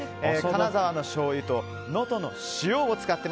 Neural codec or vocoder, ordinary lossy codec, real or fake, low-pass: none; none; real; none